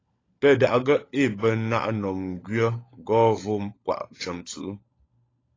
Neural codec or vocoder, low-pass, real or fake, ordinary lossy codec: codec, 16 kHz, 16 kbps, FunCodec, trained on LibriTTS, 50 frames a second; 7.2 kHz; fake; AAC, 32 kbps